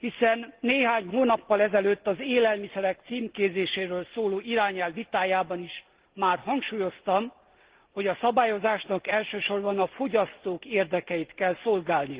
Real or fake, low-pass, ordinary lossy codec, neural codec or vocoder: real; 3.6 kHz; Opus, 16 kbps; none